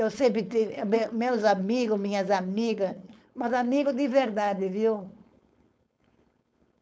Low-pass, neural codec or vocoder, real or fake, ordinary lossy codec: none; codec, 16 kHz, 4.8 kbps, FACodec; fake; none